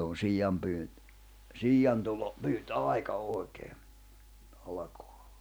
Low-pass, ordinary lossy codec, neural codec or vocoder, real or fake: none; none; none; real